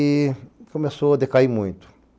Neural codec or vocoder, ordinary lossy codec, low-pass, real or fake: none; none; none; real